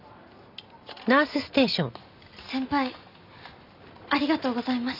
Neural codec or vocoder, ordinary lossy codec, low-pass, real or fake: none; none; 5.4 kHz; real